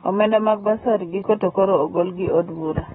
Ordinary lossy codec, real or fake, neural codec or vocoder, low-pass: AAC, 16 kbps; fake; vocoder, 44.1 kHz, 128 mel bands, Pupu-Vocoder; 19.8 kHz